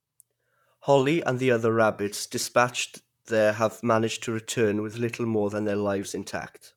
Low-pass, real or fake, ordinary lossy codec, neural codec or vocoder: 19.8 kHz; fake; none; vocoder, 44.1 kHz, 128 mel bands, Pupu-Vocoder